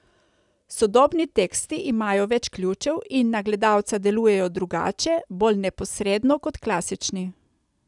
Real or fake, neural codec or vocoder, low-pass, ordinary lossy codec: real; none; 10.8 kHz; none